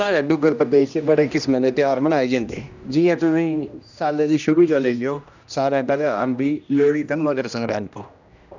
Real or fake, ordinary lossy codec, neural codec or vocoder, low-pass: fake; none; codec, 16 kHz, 1 kbps, X-Codec, HuBERT features, trained on general audio; 7.2 kHz